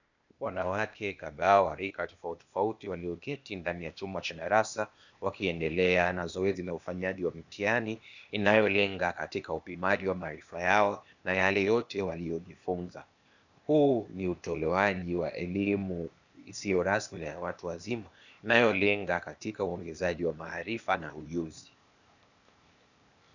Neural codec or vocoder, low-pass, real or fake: codec, 16 kHz, 0.8 kbps, ZipCodec; 7.2 kHz; fake